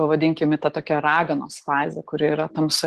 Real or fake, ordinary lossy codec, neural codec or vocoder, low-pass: real; Opus, 16 kbps; none; 10.8 kHz